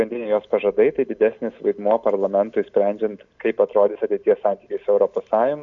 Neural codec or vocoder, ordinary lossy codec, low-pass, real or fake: none; AAC, 64 kbps; 7.2 kHz; real